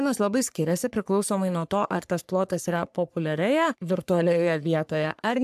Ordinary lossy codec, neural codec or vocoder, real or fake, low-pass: AAC, 96 kbps; codec, 44.1 kHz, 3.4 kbps, Pupu-Codec; fake; 14.4 kHz